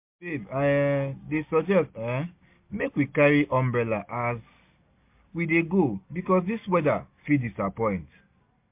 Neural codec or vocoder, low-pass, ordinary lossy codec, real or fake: none; 3.6 kHz; MP3, 32 kbps; real